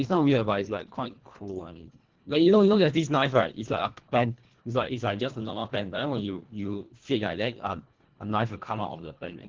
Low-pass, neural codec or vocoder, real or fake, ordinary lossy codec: 7.2 kHz; codec, 24 kHz, 1.5 kbps, HILCodec; fake; Opus, 16 kbps